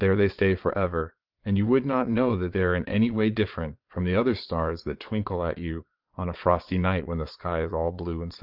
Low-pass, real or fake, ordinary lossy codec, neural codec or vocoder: 5.4 kHz; fake; Opus, 32 kbps; vocoder, 22.05 kHz, 80 mel bands, Vocos